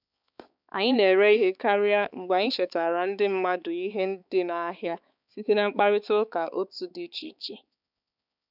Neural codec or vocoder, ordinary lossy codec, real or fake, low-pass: codec, 16 kHz, 4 kbps, X-Codec, HuBERT features, trained on balanced general audio; none; fake; 5.4 kHz